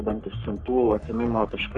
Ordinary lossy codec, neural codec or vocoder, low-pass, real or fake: MP3, 96 kbps; codec, 44.1 kHz, 3.4 kbps, Pupu-Codec; 10.8 kHz; fake